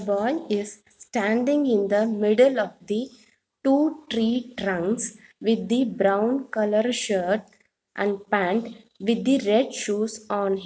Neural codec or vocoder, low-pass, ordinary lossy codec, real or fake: none; none; none; real